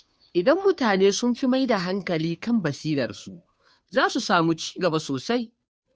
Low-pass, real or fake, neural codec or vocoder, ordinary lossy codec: none; fake; codec, 16 kHz, 2 kbps, FunCodec, trained on Chinese and English, 25 frames a second; none